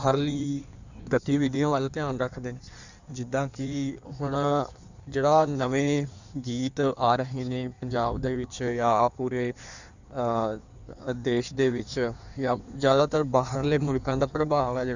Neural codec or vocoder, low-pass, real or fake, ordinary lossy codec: codec, 16 kHz in and 24 kHz out, 1.1 kbps, FireRedTTS-2 codec; 7.2 kHz; fake; none